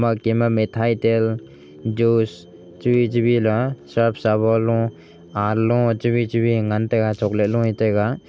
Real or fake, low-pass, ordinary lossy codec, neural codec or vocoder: real; none; none; none